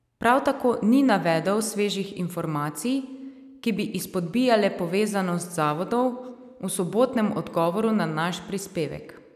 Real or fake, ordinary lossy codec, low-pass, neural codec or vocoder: real; none; 14.4 kHz; none